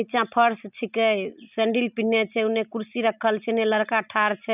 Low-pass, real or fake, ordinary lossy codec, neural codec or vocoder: 3.6 kHz; real; none; none